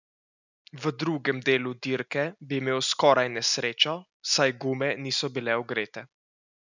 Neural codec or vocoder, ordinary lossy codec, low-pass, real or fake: none; none; 7.2 kHz; real